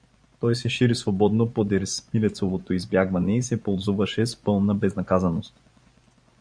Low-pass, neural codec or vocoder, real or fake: 9.9 kHz; vocoder, 24 kHz, 100 mel bands, Vocos; fake